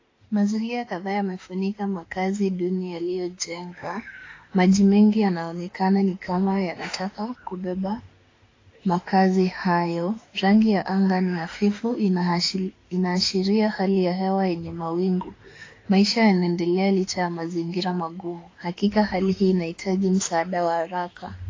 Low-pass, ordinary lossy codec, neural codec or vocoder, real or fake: 7.2 kHz; AAC, 32 kbps; autoencoder, 48 kHz, 32 numbers a frame, DAC-VAE, trained on Japanese speech; fake